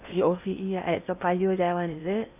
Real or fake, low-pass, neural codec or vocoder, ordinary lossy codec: fake; 3.6 kHz; codec, 16 kHz in and 24 kHz out, 0.8 kbps, FocalCodec, streaming, 65536 codes; none